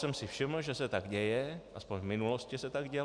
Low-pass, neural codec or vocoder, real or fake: 9.9 kHz; none; real